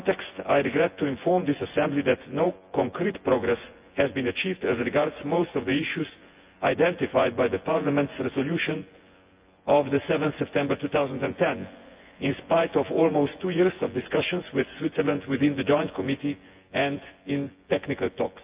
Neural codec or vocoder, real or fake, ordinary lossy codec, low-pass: vocoder, 24 kHz, 100 mel bands, Vocos; fake; Opus, 24 kbps; 3.6 kHz